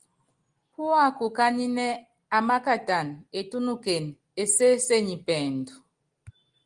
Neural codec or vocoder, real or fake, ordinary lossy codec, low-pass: none; real; Opus, 24 kbps; 9.9 kHz